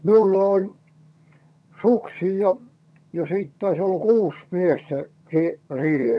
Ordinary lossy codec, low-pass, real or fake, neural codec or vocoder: none; none; fake; vocoder, 22.05 kHz, 80 mel bands, HiFi-GAN